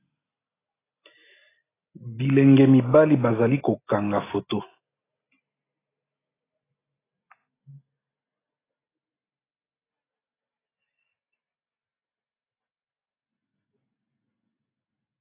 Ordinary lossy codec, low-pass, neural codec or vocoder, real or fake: AAC, 16 kbps; 3.6 kHz; none; real